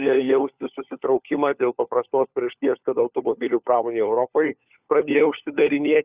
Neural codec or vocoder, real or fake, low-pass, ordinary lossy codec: codec, 16 kHz, 4 kbps, FunCodec, trained on LibriTTS, 50 frames a second; fake; 3.6 kHz; Opus, 64 kbps